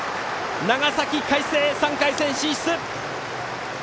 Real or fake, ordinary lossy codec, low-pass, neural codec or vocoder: real; none; none; none